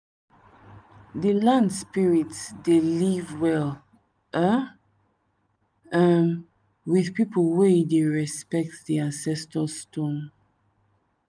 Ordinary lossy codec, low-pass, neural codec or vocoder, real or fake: none; 9.9 kHz; none; real